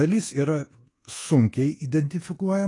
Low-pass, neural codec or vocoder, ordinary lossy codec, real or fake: 10.8 kHz; codec, 24 kHz, 1.2 kbps, DualCodec; AAC, 32 kbps; fake